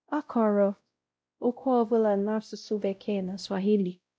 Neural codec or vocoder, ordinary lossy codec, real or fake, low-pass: codec, 16 kHz, 0.5 kbps, X-Codec, WavLM features, trained on Multilingual LibriSpeech; none; fake; none